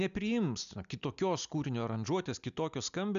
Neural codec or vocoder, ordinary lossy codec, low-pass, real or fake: none; AAC, 96 kbps; 7.2 kHz; real